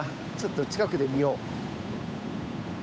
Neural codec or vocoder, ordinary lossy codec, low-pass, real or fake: codec, 16 kHz, 8 kbps, FunCodec, trained on Chinese and English, 25 frames a second; none; none; fake